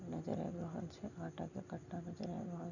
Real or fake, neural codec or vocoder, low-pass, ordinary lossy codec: real; none; 7.2 kHz; none